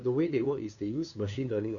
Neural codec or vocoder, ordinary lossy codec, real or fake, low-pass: codec, 16 kHz, 2 kbps, FunCodec, trained on LibriTTS, 25 frames a second; none; fake; 7.2 kHz